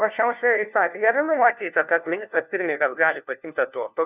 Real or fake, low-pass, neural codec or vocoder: fake; 3.6 kHz; codec, 16 kHz, 1 kbps, FunCodec, trained on LibriTTS, 50 frames a second